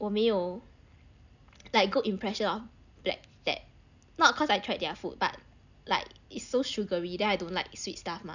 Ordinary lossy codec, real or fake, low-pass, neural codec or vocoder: none; real; 7.2 kHz; none